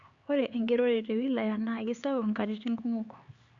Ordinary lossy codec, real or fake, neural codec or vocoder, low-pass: Opus, 64 kbps; fake; codec, 16 kHz, 4 kbps, X-Codec, HuBERT features, trained on LibriSpeech; 7.2 kHz